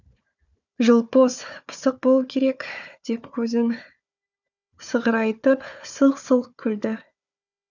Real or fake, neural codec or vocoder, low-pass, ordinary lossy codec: fake; codec, 16 kHz, 4 kbps, FunCodec, trained on Chinese and English, 50 frames a second; 7.2 kHz; none